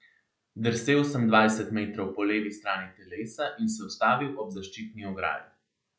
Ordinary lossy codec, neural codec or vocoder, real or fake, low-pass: none; none; real; none